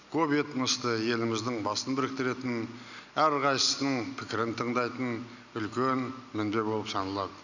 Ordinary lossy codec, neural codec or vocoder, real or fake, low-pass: none; none; real; 7.2 kHz